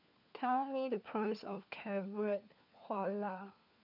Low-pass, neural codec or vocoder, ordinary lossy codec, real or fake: 5.4 kHz; codec, 16 kHz, 4 kbps, FunCodec, trained on LibriTTS, 50 frames a second; none; fake